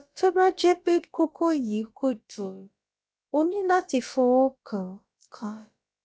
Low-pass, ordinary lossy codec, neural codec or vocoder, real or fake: none; none; codec, 16 kHz, about 1 kbps, DyCAST, with the encoder's durations; fake